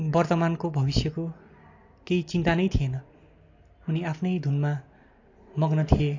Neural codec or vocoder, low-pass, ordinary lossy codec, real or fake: none; 7.2 kHz; AAC, 48 kbps; real